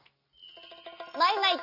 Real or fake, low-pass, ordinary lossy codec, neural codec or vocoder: real; 5.4 kHz; none; none